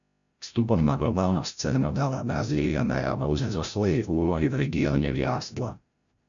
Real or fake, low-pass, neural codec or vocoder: fake; 7.2 kHz; codec, 16 kHz, 0.5 kbps, FreqCodec, larger model